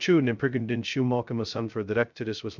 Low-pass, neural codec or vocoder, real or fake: 7.2 kHz; codec, 16 kHz, 0.2 kbps, FocalCodec; fake